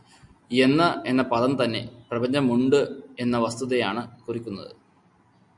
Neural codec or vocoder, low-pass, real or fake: none; 10.8 kHz; real